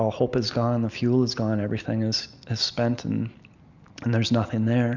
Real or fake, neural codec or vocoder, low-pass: real; none; 7.2 kHz